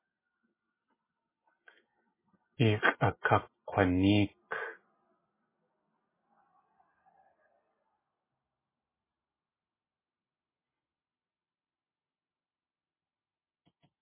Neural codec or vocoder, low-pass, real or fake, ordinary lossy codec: none; 3.6 kHz; real; MP3, 16 kbps